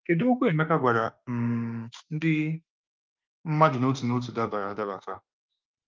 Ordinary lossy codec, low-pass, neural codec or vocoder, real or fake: Opus, 24 kbps; 7.2 kHz; autoencoder, 48 kHz, 32 numbers a frame, DAC-VAE, trained on Japanese speech; fake